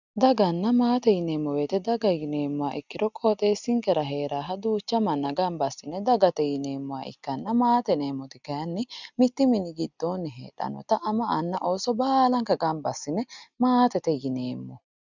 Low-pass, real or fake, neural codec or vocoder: 7.2 kHz; real; none